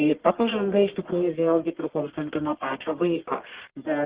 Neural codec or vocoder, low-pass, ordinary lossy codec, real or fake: codec, 44.1 kHz, 1.7 kbps, Pupu-Codec; 3.6 kHz; Opus, 16 kbps; fake